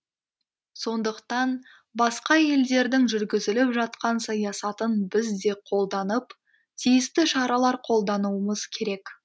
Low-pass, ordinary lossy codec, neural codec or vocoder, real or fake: none; none; none; real